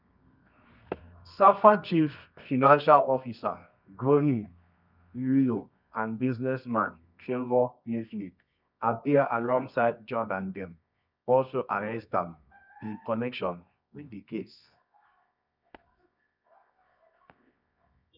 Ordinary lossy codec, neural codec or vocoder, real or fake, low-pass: none; codec, 24 kHz, 0.9 kbps, WavTokenizer, medium music audio release; fake; 5.4 kHz